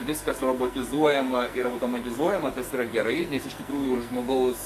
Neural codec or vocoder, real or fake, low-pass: codec, 44.1 kHz, 2.6 kbps, SNAC; fake; 14.4 kHz